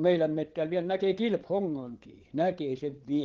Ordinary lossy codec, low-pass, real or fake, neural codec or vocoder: Opus, 16 kbps; 7.2 kHz; fake; codec, 16 kHz, 8 kbps, FreqCodec, larger model